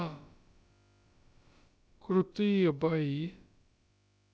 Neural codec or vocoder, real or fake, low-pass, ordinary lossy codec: codec, 16 kHz, about 1 kbps, DyCAST, with the encoder's durations; fake; none; none